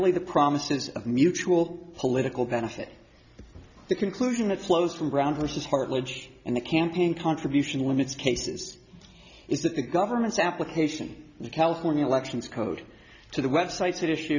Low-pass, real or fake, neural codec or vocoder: 7.2 kHz; real; none